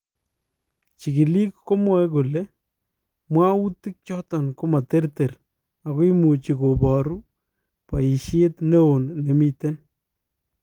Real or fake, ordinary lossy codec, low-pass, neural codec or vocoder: real; Opus, 24 kbps; 19.8 kHz; none